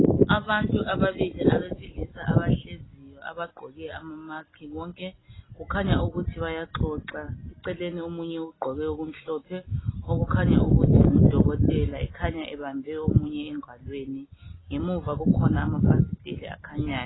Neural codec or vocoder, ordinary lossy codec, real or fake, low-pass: none; AAC, 16 kbps; real; 7.2 kHz